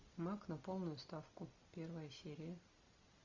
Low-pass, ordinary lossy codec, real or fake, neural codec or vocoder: 7.2 kHz; Opus, 64 kbps; real; none